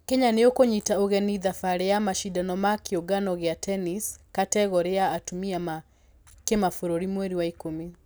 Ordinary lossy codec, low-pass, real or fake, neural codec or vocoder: none; none; real; none